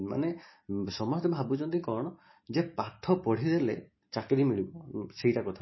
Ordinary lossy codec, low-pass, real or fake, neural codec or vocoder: MP3, 24 kbps; 7.2 kHz; real; none